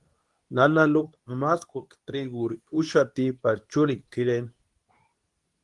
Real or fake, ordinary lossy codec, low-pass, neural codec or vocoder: fake; Opus, 24 kbps; 10.8 kHz; codec, 24 kHz, 0.9 kbps, WavTokenizer, medium speech release version 2